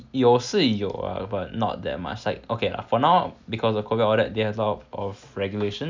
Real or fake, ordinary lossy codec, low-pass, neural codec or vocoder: real; MP3, 64 kbps; 7.2 kHz; none